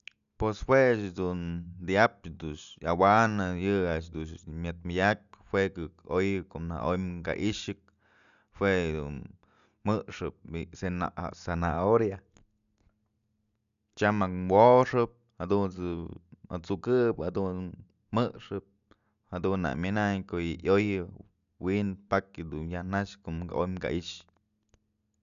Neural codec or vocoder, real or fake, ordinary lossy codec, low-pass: none; real; none; 7.2 kHz